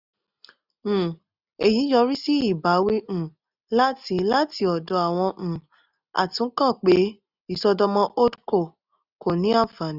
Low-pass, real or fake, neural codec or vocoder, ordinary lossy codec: 5.4 kHz; real; none; none